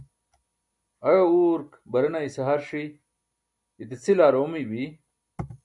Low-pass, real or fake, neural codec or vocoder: 10.8 kHz; real; none